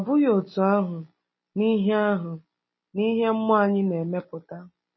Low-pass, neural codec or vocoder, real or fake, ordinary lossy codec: 7.2 kHz; none; real; MP3, 24 kbps